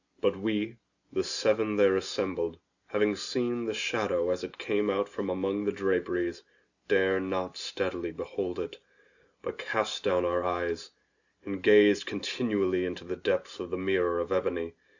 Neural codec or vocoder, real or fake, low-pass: none; real; 7.2 kHz